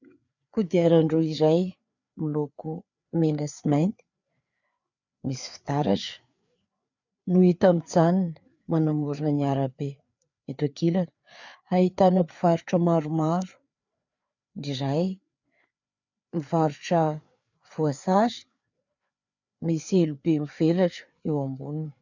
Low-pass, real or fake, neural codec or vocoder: 7.2 kHz; fake; codec, 16 kHz, 4 kbps, FreqCodec, larger model